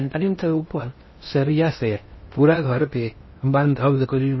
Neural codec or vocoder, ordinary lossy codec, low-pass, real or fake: codec, 16 kHz in and 24 kHz out, 0.6 kbps, FocalCodec, streaming, 2048 codes; MP3, 24 kbps; 7.2 kHz; fake